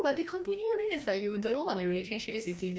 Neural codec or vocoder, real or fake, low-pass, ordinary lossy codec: codec, 16 kHz, 1 kbps, FreqCodec, larger model; fake; none; none